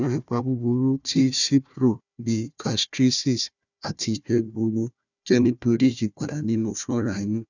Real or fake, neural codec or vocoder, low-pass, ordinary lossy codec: fake; codec, 16 kHz, 1 kbps, FunCodec, trained on Chinese and English, 50 frames a second; 7.2 kHz; none